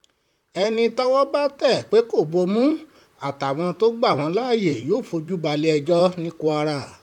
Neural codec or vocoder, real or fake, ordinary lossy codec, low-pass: vocoder, 44.1 kHz, 128 mel bands, Pupu-Vocoder; fake; none; 19.8 kHz